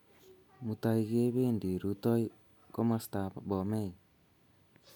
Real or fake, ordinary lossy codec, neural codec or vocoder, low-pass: real; none; none; none